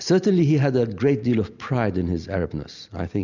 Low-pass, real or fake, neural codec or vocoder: 7.2 kHz; real; none